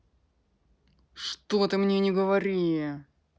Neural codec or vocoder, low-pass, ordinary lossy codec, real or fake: none; none; none; real